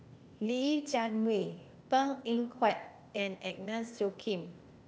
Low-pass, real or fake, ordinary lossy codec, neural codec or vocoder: none; fake; none; codec, 16 kHz, 0.8 kbps, ZipCodec